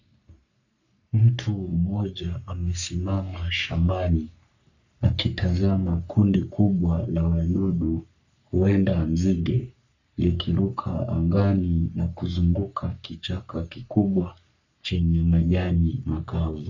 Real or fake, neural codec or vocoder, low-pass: fake; codec, 44.1 kHz, 3.4 kbps, Pupu-Codec; 7.2 kHz